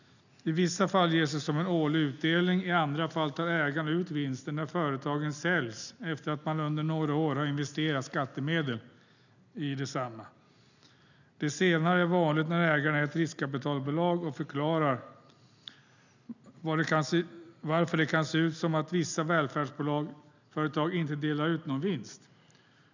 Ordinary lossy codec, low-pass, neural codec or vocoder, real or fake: none; 7.2 kHz; none; real